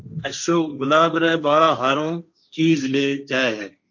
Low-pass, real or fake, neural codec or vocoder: 7.2 kHz; fake; codec, 16 kHz, 1.1 kbps, Voila-Tokenizer